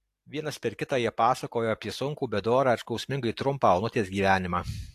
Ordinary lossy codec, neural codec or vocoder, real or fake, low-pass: AAC, 64 kbps; none; real; 14.4 kHz